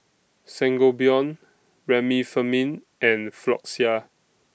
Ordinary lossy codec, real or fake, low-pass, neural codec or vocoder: none; real; none; none